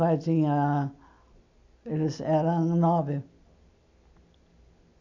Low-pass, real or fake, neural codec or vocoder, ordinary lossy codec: 7.2 kHz; real; none; none